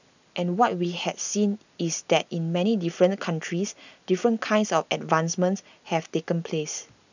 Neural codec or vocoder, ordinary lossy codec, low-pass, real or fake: none; none; 7.2 kHz; real